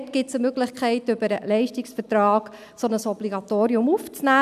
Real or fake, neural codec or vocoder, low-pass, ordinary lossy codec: real; none; 14.4 kHz; none